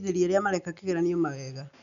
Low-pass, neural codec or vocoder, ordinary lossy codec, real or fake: 7.2 kHz; none; MP3, 96 kbps; real